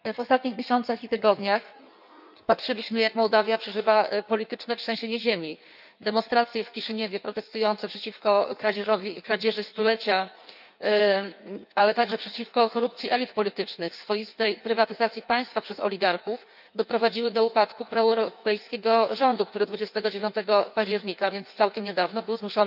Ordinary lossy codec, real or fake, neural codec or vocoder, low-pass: none; fake; codec, 16 kHz in and 24 kHz out, 1.1 kbps, FireRedTTS-2 codec; 5.4 kHz